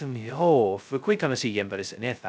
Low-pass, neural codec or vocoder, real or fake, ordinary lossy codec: none; codec, 16 kHz, 0.2 kbps, FocalCodec; fake; none